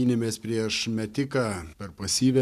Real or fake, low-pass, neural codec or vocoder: fake; 14.4 kHz; vocoder, 44.1 kHz, 128 mel bands every 512 samples, BigVGAN v2